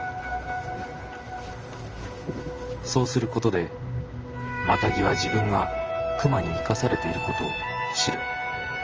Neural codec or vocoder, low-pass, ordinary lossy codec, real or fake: vocoder, 44.1 kHz, 128 mel bands, Pupu-Vocoder; 7.2 kHz; Opus, 24 kbps; fake